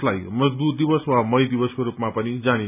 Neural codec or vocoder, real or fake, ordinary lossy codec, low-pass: none; real; none; 3.6 kHz